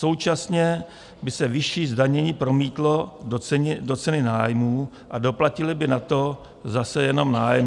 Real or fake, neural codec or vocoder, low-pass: real; none; 10.8 kHz